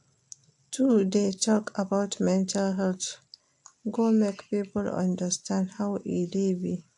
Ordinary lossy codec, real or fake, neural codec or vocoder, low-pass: AAC, 64 kbps; real; none; 10.8 kHz